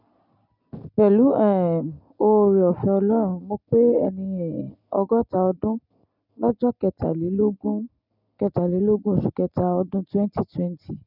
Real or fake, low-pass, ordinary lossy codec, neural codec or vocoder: real; 5.4 kHz; none; none